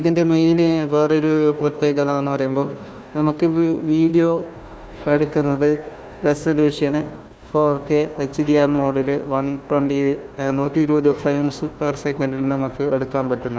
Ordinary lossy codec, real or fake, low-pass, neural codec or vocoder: none; fake; none; codec, 16 kHz, 1 kbps, FunCodec, trained on Chinese and English, 50 frames a second